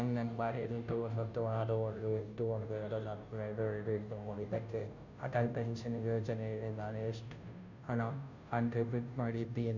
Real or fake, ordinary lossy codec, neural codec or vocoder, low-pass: fake; none; codec, 16 kHz, 0.5 kbps, FunCodec, trained on Chinese and English, 25 frames a second; 7.2 kHz